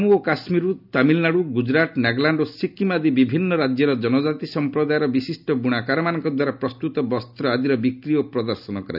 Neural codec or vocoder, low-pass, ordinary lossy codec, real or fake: none; 5.4 kHz; none; real